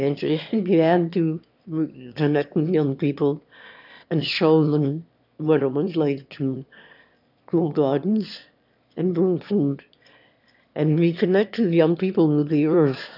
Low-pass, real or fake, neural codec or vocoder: 5.4 kHz; fake; autoencoder, 22.05 kHz, a latent of 192 numbers a frame, VITS, trained on one speaker